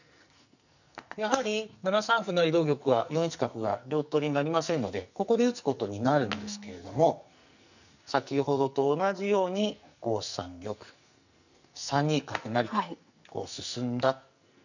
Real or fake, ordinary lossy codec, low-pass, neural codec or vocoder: fake; none; 7.2 kHz; codec, 44.1 kHz, 2.6 kbps, SNAC